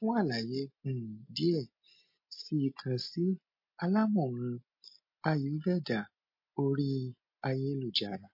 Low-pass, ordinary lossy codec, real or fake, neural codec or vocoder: 5.4 kHz; MP3, 32 kbps; real; none